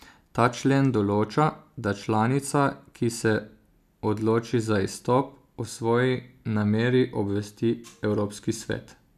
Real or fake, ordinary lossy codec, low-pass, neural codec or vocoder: real; none; 14.4 kHz; none